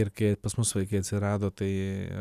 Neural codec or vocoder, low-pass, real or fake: none; 14.4 kHz; real